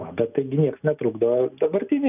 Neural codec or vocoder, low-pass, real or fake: none; 3.6 kHz; real